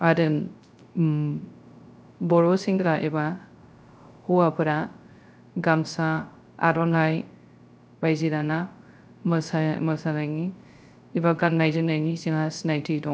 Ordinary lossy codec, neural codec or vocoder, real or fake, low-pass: none; codec, 16 kHz, 0.3 kbps, FocalCodec; fake; none